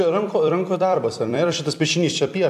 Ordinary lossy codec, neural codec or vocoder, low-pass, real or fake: AAC, 96 kbps; vocoder, 44.1 kHz, 128 mel bands every 256 samples, BigVGAN v2; 14.4 kHz; fake